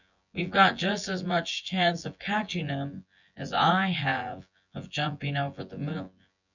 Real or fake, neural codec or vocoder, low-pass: fake; vocoder, 24 kHz, 100 mel bands, Vocos; 7.2 kHz